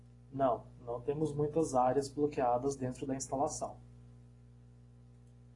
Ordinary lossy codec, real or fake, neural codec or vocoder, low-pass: AAC, 32 kbps; real; none; 10.8 kHz